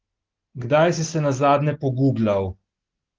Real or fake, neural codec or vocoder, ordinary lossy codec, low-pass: real; none; Opus, 16 kbps; 7.2 kHz